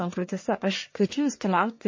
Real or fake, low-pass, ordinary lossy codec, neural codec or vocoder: fake; 7.2 kHz; MP3, 32 kbps; codec, 44.1 kHz, 1.7 kbps, Pupu-Codec